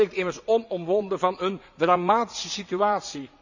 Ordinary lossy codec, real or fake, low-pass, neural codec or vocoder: none; fake; 7.2 kHz; vocoder, 44.1 kHz, 80 mel bands, Vocos